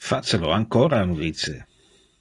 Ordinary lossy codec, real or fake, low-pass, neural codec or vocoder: AAC, 32 kbps; real; 10.8 kHz; none